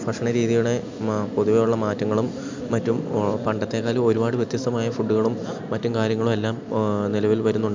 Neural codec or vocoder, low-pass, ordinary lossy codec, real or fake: none; 7.2 kHz; MP3, 64 kbps; real